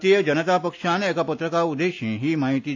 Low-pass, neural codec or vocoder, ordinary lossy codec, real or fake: 7.2 kHz; none; AAC, 48 kbps; real